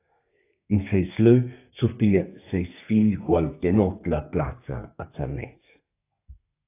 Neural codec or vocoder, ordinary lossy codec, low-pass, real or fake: codec, 32 kHz, 1.9 kbps, SNAC; AAC, 24 kbps; 3.6 kHz; fake